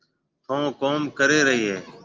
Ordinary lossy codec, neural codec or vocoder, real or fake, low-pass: Opus, 32 kbps; none; real; 7.2 kHz